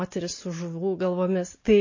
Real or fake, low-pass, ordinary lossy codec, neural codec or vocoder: real; 7.2 kHz; MP3, 32 kbps; none